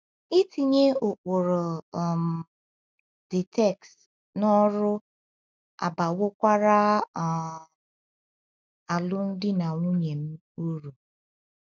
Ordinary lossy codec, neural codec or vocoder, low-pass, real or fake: none; none; none; real